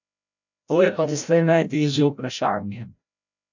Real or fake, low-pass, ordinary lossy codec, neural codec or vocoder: fake; 7.2 kHz; none; codec, 16 kHz, 0.5 kbps, FreqCodec, larger model